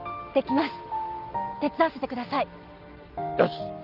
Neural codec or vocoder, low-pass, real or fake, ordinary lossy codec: none; 5.4 kHz; real; Opus, 32 kbps